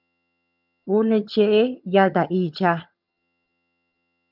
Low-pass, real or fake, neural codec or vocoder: 5.4 kHz; fake; vocoder, 22.05 kHz, 80 mel bands, HiFi-GAN